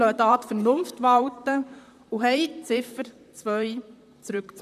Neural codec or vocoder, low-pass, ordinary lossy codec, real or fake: vocoder, 44.1 kHz, 128 mel bands, Pupu-Vocoder; 14.4 kHz; none; fake